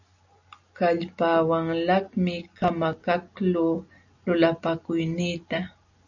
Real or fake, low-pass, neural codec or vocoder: real; 7.2 kHz; none